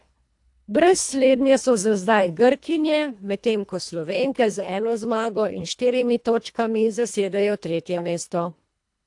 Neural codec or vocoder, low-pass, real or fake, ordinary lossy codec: codec, 24 kHz, 1.5 kbps, HILCodec; 10.8 kHz; fake; AAC, 64 kbps